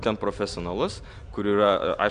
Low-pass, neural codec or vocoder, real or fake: 9.9 kHz; none; real